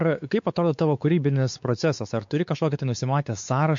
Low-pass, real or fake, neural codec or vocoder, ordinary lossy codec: 7.2 kHz; fake; codec, 16 kHz, 4 kbps, X-Codec, WavLM features, trained on Multilingual LibriSpeech; MP3, 48 kbps